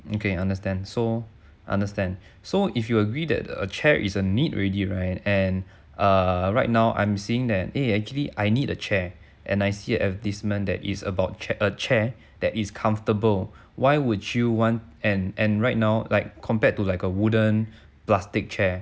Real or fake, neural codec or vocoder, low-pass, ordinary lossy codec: real; none; none; none